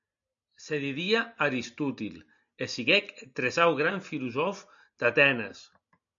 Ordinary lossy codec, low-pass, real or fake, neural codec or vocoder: MP3, 64 kbps; 7.2 kHz; real; none